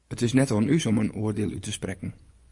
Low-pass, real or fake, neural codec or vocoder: 10.8 kHz; fake; vocoder, 24 kHz, 100 mel bands, Vocos